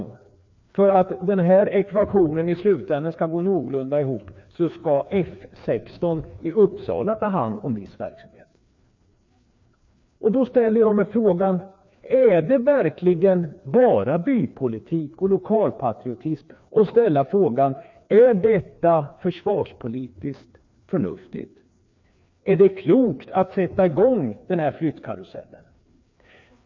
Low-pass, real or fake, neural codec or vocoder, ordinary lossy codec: 7.2 kHz; fake; codec, 16 kHz, 2 kbps, FreqCodec, larger model; MP3, 48 kbps